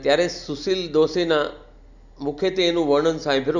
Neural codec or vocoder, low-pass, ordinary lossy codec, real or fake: none; 7.2 kHz; none; real